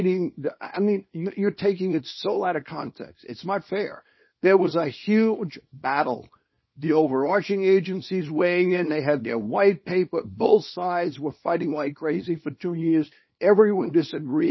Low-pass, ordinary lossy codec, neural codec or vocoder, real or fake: 7.2 kHz; MP3, 24 kbps; codec, 24 kHz, 0.9 kbps, WavTokenizer, small release; fake